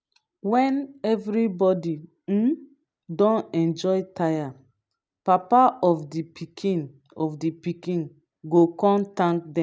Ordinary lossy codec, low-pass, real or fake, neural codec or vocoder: none; none; real; none